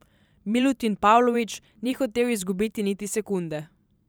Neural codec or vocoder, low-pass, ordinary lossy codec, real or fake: vocoder, 44.1 kHz, 128 mel bands every 512 samples, BigVGAN v2; none; none; fake